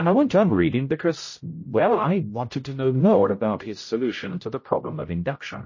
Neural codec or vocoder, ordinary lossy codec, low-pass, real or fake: codec, 16 kHz, 0.5 kbps, X-Codec, HuBERT features, trained on general audio; MP3, 32 kbps; 7.2 kHz; fake